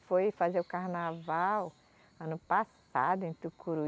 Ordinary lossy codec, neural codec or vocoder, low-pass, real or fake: none; none; none; real